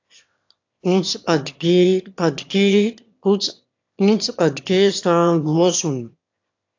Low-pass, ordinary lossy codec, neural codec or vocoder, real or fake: 7.2 kHz; AAC, 48 kbps; autoencoder, 22.05 kHz, a latent of 192 numbers a frame, VITS, trained on one speaker; fake